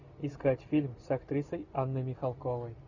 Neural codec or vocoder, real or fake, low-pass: none; real; 7.2 kHz